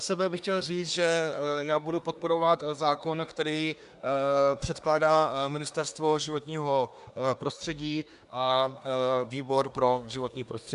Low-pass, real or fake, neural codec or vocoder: 10.8 kHz; fake; codec, 24 kHz, 1 kbps, SNAC